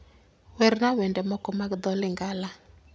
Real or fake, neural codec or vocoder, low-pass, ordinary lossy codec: real; none; none; none